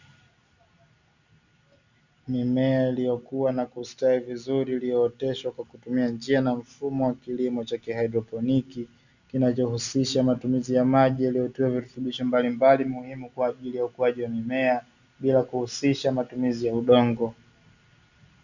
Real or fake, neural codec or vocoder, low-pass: real; none; 7.2 kHz